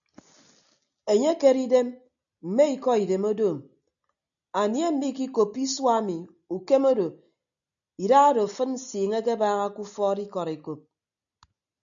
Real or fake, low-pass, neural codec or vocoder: real; 7.2 kHz; none